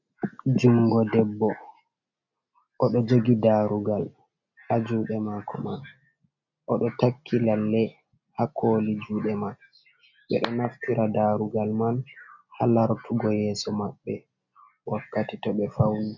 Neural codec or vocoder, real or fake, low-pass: none; real; 7.2 kHz